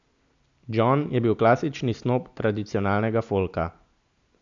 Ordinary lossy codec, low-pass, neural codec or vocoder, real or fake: MP3, 64 kbps; 7.2 kHz; none; real